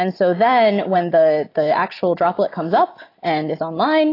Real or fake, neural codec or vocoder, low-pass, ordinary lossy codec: real; none; 5.4 kHz; AAC, 24 kbps